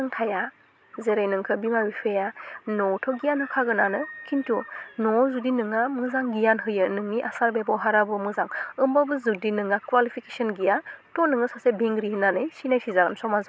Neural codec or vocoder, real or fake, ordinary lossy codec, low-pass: none; real; none; none